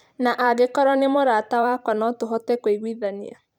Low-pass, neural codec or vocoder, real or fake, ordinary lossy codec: 19.8 kHz; vocoder, 44.1 kHz, 128 mel bands every 512 samples, BigVGAN v2; fake; none